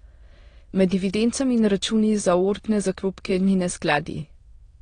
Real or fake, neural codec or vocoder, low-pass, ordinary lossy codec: fake; autoencoder, 22.05 kHz, a latent of 192 numbers a frame, VITS, trained on many speakers; 9.9 kHz; AAC, 32 kbps